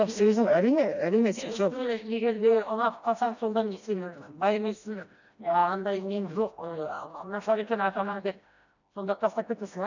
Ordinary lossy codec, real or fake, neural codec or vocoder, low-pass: none; fake; codec, 16 kHz, 1 kbps, FreqCodec, smaller model; 7.2 kHz